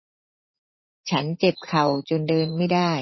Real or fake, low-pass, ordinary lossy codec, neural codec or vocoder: fake; 7.2 kHz; MP3, 24 kbps; vocoder, 44.1 kHz, 80 mel bands, Vocos